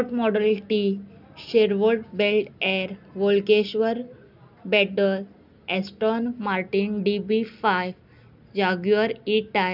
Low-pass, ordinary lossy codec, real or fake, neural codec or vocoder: 5.4 kHz; none; real; none